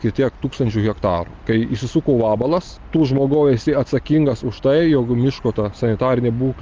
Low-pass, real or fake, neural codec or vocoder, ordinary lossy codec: 7.2 kHz; real; none; Opus, 32 kbps